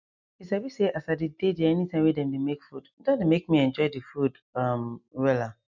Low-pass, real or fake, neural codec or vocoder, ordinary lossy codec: 7.2 kHz; real; none; none